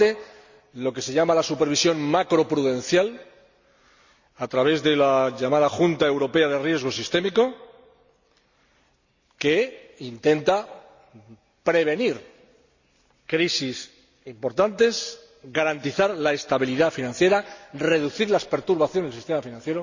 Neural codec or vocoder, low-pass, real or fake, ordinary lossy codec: none; 7.2 kHz; real; Opus, 64 kbps